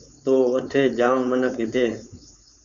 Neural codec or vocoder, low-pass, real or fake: codec, 16 kHz, 4.8 kbps, FACodec; 7.2 kHz; fake